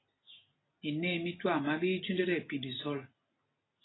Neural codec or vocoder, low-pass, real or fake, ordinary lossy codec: none; 7.2 kHz; real; AAC, 16 kbps